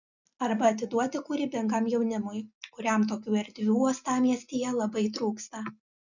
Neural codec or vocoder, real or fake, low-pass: none; real; 7.2 kHz